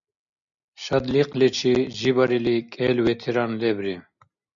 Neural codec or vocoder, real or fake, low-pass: none; real; 7.2 kHz